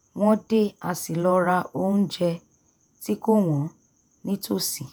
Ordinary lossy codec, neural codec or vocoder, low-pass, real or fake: none; vocoder, 48 kHz, 128 mel bands, Vocos; none; fake